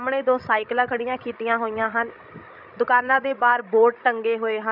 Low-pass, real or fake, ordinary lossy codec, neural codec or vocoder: 5.4 kHz; fake; none; codec, 16 kHz, 16 kbps, FreqCodec, larger model